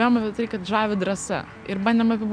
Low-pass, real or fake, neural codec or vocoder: 9.9 kHz; real; none